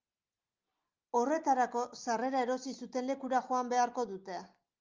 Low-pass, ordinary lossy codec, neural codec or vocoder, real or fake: 7.2 kHz; Opus, 32 kbps; none; real